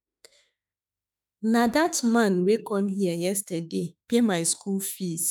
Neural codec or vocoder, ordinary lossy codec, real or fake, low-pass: autoencoder, 48 kHz, 32 numbers a frame, DAC-VAE, trained on Japanese speech; none; fake; none